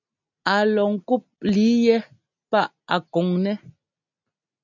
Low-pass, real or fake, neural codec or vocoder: 7.2 kHz; real; none